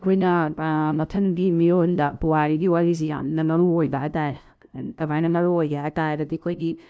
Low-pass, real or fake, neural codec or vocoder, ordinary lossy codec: none; fake; codec, 16 kHz, 0.5 kbps, FunCodec, trained on LibriTTS, 25 frames a second; none